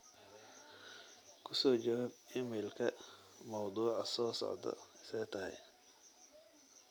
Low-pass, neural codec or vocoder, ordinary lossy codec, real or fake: none; none; none; real